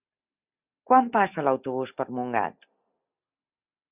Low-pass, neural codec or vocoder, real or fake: 3.6 kHz; none; real